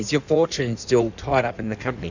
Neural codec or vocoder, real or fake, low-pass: codec, 16 kHz in and 24 kHz out, 1.1 kbps, FireRedTTS-2 codec; fake; 7.2 kHz